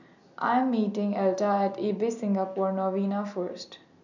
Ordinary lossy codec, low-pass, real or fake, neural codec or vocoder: none; 7.2 kHz; real; none